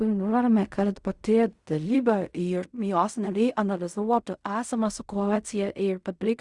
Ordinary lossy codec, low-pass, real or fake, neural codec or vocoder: Opus, 64 kbps; 10.8 kHz; fake; codec, 16 kHz in and 24 kHz out, 0.4 kbps, LongCat-Audio-Codec, fine tuned four codebook decoder